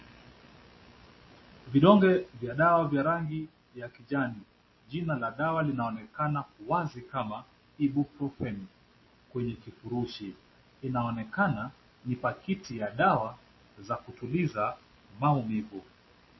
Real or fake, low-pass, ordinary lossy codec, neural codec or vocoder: real; 7.2 kHz; MP3, 24 kbps; none